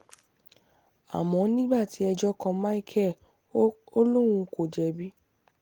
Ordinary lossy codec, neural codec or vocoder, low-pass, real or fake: Opus, 24 kbps; none; 19.8 kHz; real